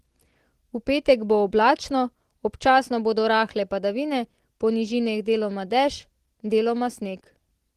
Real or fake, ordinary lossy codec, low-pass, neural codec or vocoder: real; Opus, 16 kbps; 14.4 kHz; none